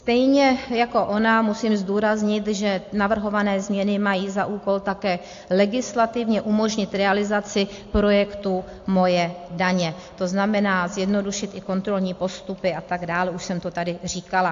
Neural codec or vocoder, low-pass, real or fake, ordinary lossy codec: none; 7.2 kHz; real; AAC, 48 kbps